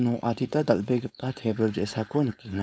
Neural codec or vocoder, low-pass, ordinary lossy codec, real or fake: codec, 16 kHz, 4.8 kbps, FACodec; none; none; fake